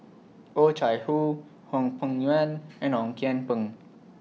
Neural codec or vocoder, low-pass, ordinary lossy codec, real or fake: none; none; none; real